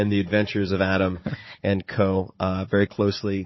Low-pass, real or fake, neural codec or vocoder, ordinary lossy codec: 7.2 kHz; fake; codec, 16 kHz, 16 kbps, FunCodec, trained on Chinese and English, 50 frames a second; MP3, 24 kbps